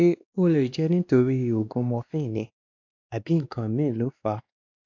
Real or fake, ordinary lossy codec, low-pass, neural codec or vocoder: fake; AAC, 48 kbps; 7.2 kHz; codec, 16 kHz, 2 kbps, X-Codec, WavLM features, trained on Multilingual LibriSpeech